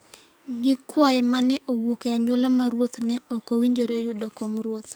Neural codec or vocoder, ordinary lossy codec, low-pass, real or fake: codec, 44.1 kHz, 2.6 kbps, SNAC; none; none; fake